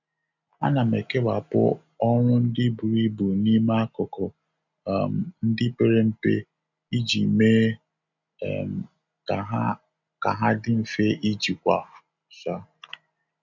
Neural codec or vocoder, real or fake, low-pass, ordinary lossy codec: none; real; 7.2 kHz; none